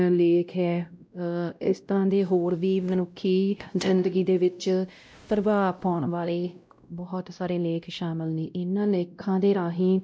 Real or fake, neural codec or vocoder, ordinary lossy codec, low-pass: fake; codec, 16 kHz, 0.5 kbps, X-Codec, WavLM features, trained on Multilingual LibriSpeech; none; none